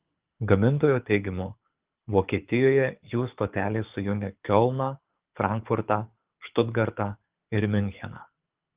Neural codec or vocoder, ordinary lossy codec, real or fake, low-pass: codec, 24 kHz, 6 kbps, HILCodec; Opus, 32 kbps; fake; 3.6 kHz